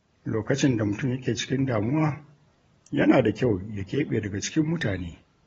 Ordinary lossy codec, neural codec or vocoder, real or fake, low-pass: AAC, 24 kbps; vocoder, 44.1 kHz, 128 mel bands every 512 samples, BigVGAN v2; fake; 19.8 kHz